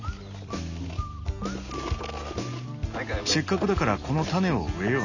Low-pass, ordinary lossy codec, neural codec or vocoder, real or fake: 7.2 kHz; none; none; real